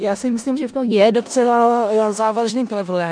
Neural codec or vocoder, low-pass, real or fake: codec, 16 kHz in and 24 kHz out, 0.4 kbps, LongCat-Audio-Codec, four codebook decoder; 9.9 kHz; fake